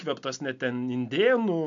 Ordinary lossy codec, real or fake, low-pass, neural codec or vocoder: MP3, 64 kbps; real; 7.2 kHz; none